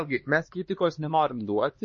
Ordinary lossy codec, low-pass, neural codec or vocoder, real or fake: MP3, 32 kbps; 7.2 kHz; codec, 16 kHz, 2 kbps, X-Codec, HuBERT features, trained on LibriSpeech; fake